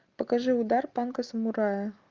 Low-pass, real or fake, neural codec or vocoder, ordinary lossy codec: 7.2 kHz; real; none; Opus, 24 kbps